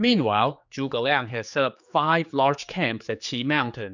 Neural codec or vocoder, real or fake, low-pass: codec, 16 kHz, 4 kbps, X-Codec, HuBERT features, trained on general audio; fake; 7.2 kHz